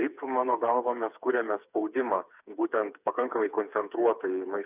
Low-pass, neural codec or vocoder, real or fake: 3.6 kHz; codec, 16 kHz, 4 kbps, FreqCodec, smaller model; fake